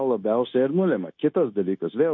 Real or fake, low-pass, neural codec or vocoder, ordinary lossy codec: fake; 7.2 kHz; codec, 16 kHz, 0.9 kbps, LongCat-Audio-Codec; MP3, 48 kbps